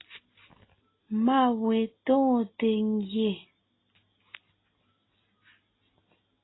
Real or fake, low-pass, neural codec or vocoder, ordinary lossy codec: real; 7.2 kHz; none; AAC, 16 kbps